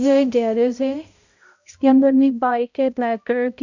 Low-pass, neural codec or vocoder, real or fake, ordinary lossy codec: 7.2 kHz; codec, 16 kHz, 0.5 kbps, X-Codec, HuBERT features, trained on balanced general audio; fake; none